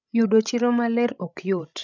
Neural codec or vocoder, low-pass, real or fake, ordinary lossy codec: codec, 16 kHz, 16 kbps, FreqCodec, larger model; 7.2 kHz; fake; none